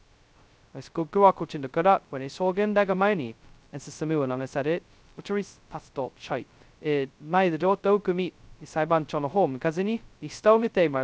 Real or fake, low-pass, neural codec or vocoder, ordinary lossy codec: fake; none; codec, 16 kHz, 0.2 kbps, FocalCodec; none